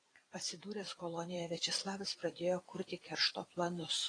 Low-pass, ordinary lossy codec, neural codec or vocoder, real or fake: 9.9 kHz; AAC, 32 kbps; vocoder, 44.1 kHz, 128 mel bands every 256 samples, BigVGAN v2; fake